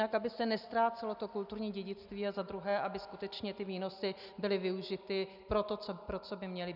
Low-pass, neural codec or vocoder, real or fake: 5.4 kHz; none; real